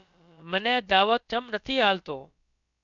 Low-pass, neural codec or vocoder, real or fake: 7.2 kHz; codec, 16 kHz, about 1 kbps, DyCAST, with the encoder's durations; fake